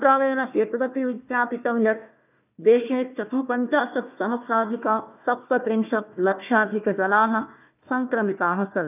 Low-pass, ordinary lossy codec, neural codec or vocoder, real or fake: 3.6 kHz; none; codec, 16 kHz, 1 kbps, FunCodec, trained on Chinese and English, 50 frames a second; fake